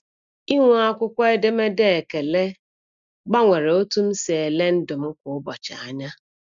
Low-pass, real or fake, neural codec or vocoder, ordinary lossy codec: 7.2 kHz; real; none; MP3, 96 kbps